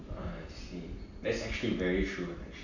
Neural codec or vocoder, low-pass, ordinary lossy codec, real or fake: none; 7.2 kHz; none; real